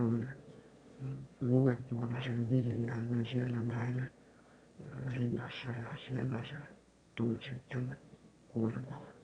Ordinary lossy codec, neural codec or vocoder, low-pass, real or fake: Opus, 64 kbps; autoencoder, 22.05 kHz, a latent of 192 numbers a frame, VITS, trained on one speaker; 9.9 kHz; fake